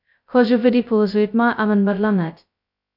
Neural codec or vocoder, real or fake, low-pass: codec, 16 kHz, 0.2 kbps, FocalCodec; fake; 5.4 kHz